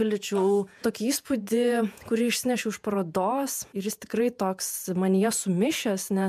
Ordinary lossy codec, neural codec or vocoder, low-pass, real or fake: MP3, 96 kbps; vocoder, 48 kHz, 128 mel bands, Vocos; 14.4 kHz; fake